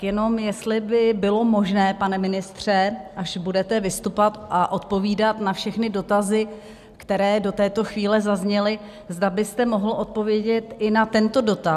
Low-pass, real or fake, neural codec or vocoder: 14.4 kHz; real; none